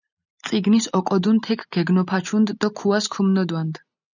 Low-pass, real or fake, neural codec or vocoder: 7.2 kHz; real; none